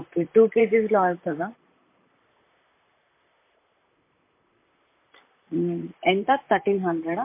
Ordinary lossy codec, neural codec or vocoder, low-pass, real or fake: MP3, 24 kbps; vocoder, 44.1 kHz, 128 mel bands, Pupu-Vocoder; 3.6 kHz; fake